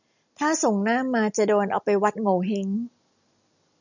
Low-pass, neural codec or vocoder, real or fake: 7.2 kHz; none; real